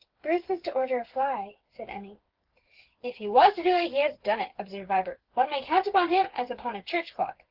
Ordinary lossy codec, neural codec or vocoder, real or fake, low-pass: Opus, 16 kbps; codec, 16 kHz, 8 kbps, FreqCodec, smaller model; fake; 5.4 kHz